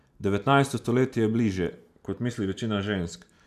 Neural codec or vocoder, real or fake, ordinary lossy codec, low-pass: vocoder, 44.1 kHz, 128 mel bands every 512 samples, BigVGAN v2; fake; none; 14.4 kHz